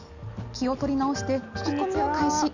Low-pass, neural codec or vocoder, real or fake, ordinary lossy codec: 7.2 kHz; none; real; none